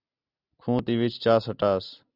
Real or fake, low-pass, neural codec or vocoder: real; 5.4 kHz; none